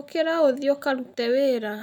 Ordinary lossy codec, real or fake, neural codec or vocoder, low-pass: none; real; none; 19.8 kHz